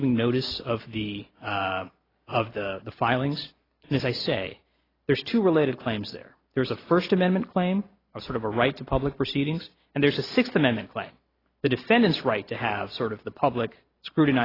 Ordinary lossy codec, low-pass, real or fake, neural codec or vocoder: AAC, 24 kbps; 5.4 kHz; real; none